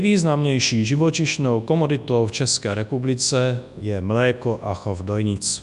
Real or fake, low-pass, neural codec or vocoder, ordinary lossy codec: fake; 10.8 kHz; codec, 24 kHz, 0.9 kbps, WavTokenizer, large speech release; AAC, 96 kbps